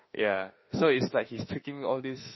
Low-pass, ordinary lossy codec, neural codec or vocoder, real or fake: 7.2 kHz; MP3, 24 kbps; autoencoder, 48 kHz, 32 numbers a frame, DAC-VAE, trained on Japanese speech; fake